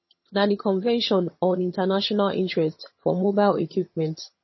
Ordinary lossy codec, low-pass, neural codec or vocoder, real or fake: MP3, 24 kbps; 7.2 kHz; vocoder, 22.05 kHz, 80 mel bands, HiFi-GAN; fake